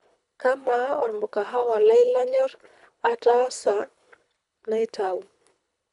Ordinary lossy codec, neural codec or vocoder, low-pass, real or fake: none; codec, 24 kHz, 3 kbps, HILCodec; 10.8 kHz; fake